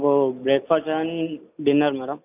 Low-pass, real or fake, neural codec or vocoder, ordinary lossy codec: 3.6 kHz; real; none; none